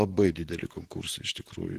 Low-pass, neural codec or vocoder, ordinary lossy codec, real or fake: 14.4 kHz; vocoder, 44.1 kHz, 128 mel bands, Pupu-Vocoder; Opus, 16 kbps; fake